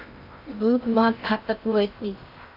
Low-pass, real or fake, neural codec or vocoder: 5.4 kHz; fake; codec, 16 kHz in and 24 kHz out, 0.6 kbps, FocalCodec, streaming, 2048 codes